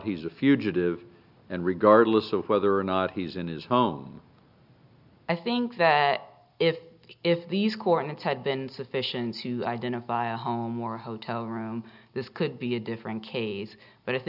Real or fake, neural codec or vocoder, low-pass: real; none; 5.4 kHz